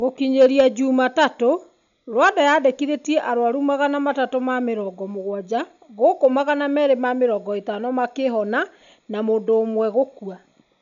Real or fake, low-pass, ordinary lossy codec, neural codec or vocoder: real; 7.2 kHz; none; none